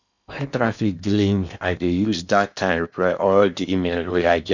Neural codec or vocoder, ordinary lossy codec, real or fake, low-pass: codec, 16 kHz in and 24 kHz out, 0.8 kbps, FocalCodec, streaming, 65536 codes; none; fake; 7.2 kHz